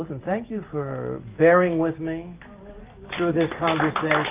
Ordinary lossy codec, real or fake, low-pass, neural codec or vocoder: Opus, 24 kbps; real; 3.6 kHz; none